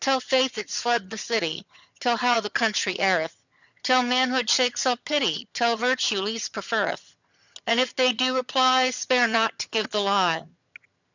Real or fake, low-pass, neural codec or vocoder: fake; 7.2 kHz; vocoder, 22.05 kHz, 80 mel bands, HiFi-GAN